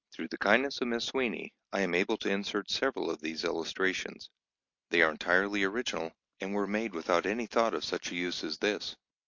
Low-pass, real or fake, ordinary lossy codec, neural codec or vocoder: 7.2 kHz; real; MP3, 48 kbps; none